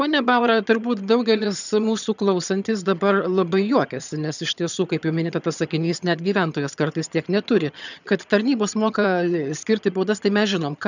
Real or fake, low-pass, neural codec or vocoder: fake; 7.2 kHz; vocoder, 22.05 kHz, 80 mel bands, HiFi-GAN